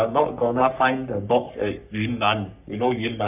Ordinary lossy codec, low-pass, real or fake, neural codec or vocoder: none; 3.6 kHz; fake; codec, 44.1 kHz, 3.4 kbps, Pupu-Codec